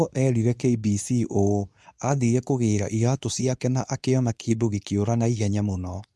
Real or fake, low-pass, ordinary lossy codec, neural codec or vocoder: fake; none; none; codec, 24 kHz, 0.9 kbps, WavTokenizer, medium speech release version 2